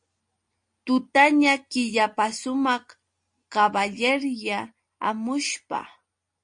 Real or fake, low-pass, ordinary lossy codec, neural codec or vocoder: real; 9.9 kHz; MP3, 48 kbps; none